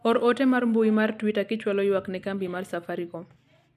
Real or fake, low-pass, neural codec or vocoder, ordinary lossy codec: real; 14.4 kHz; none; none